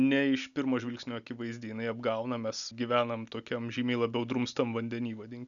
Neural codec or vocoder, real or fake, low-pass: none; real; 7.2 kHz